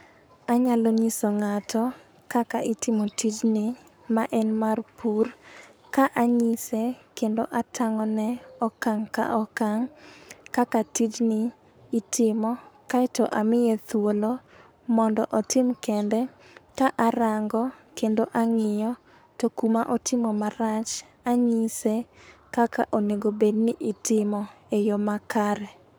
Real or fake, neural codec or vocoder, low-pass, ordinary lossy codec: fake; codec, 44.1 kHz, 7.8 kbps, Pupu-Codec; none; none